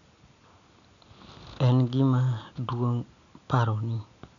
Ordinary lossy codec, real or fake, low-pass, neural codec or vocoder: none; real; 7.2 kHz; none